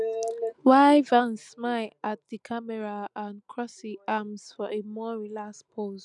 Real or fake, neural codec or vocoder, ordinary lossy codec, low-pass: real; none; none; 10.8 kHz